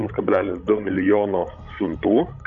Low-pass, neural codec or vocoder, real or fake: 7.2 kHz; codec, 16 kHz, 16 kbps, FreqCodec, larger model; fake